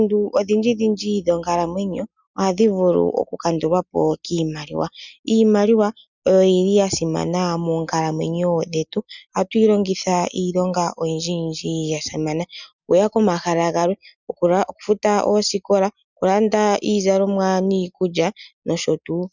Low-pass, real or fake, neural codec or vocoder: 7.2 kHz; real; none